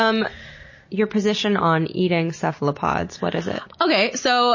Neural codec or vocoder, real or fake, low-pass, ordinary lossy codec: none; real; 7.2 kHz; MP3, 32 kbps